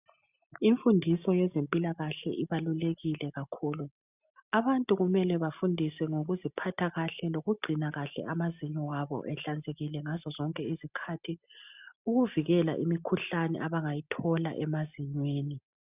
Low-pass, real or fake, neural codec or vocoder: 3.6 kHz; real; none